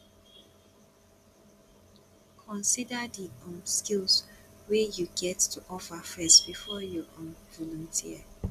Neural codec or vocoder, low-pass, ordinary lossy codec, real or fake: none; 14.4 kHz; none; real